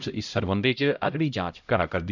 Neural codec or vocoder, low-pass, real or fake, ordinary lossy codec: codec, 16 kHz, 0.5 kbps, X-Codec, HuBERT features, trained on LibriSpeech; 7.2 kHz; fake; none